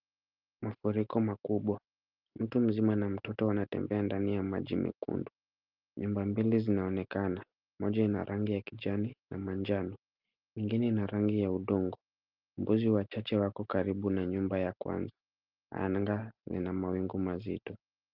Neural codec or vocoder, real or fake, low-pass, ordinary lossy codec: none; real; 5.4 kHz; Opus, 32 kbps